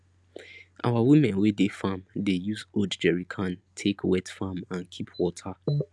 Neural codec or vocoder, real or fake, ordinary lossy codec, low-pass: none; real; none; none